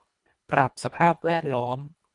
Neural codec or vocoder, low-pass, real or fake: codec, 24 kHz, 1.5 kbps, HILCodec; 10.8 kHz; fake